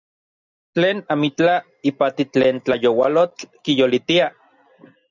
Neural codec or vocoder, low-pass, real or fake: none; 7.2 kHz; real